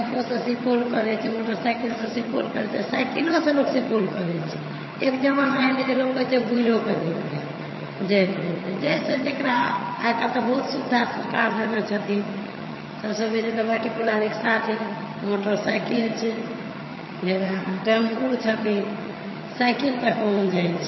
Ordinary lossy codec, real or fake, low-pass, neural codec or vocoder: MP3, 24 kbps; fake; 7.2 kHz; vocoder, 22.05 kHz, 80 mel bands, HiFi-GAN